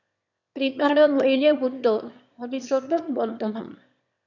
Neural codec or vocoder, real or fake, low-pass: autoencoder, 22.05 kHz, a latent of 192 numbers a frame, VITS, trained on one speaker; fake; 7.2 kHz